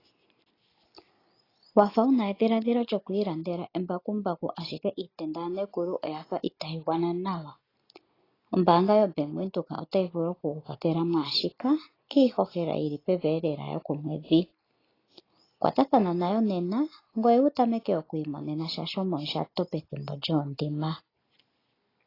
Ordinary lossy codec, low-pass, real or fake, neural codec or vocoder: AAC, 24 kbps; 5.4 kHz; real; none